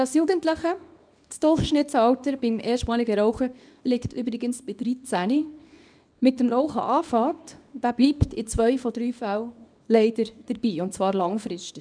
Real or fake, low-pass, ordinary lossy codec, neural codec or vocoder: fake; 9.9 kHz; none; codec, 24 kHz, 0.9 kbps, WavTokenizer, small release